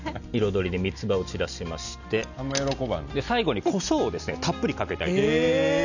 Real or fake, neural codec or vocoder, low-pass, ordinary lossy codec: real; none; 7.2 kHz; none